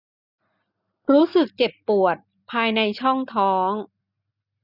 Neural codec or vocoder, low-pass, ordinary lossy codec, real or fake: none; 5.4 kHz; none; real